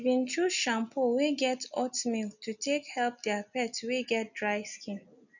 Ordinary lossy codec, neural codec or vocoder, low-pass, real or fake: none; none; 7.2 kHz; real